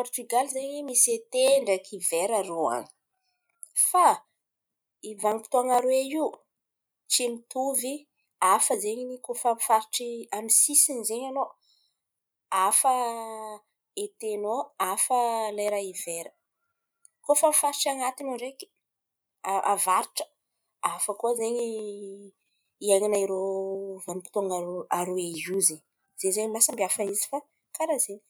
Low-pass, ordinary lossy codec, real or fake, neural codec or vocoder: none; none; real; none